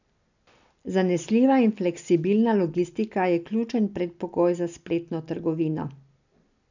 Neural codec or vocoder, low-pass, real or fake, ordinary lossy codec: none; 7.2 kHz; real; none